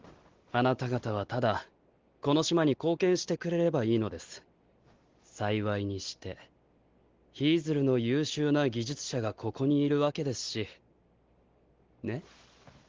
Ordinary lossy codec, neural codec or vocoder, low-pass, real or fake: Opus, 16 kbps; none; 7.2 kHz; real